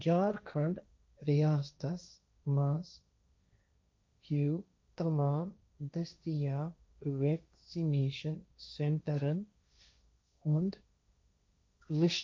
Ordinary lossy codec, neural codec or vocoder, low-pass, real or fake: none; codec, 16 kHz, 1.1 kbps, Voila-Tokenizer; none; fake